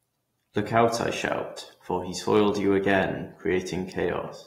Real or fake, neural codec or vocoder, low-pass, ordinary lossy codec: real; none; 19.8 kHz; AAC, 48 kbps